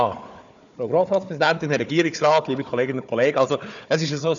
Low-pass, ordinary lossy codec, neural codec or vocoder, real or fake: 7.2 kHz; MP3, 96 kbps; codec, 16 kHz, 4 kbps, FunCodec, trained on Chinese and English, 50 frames a second; fake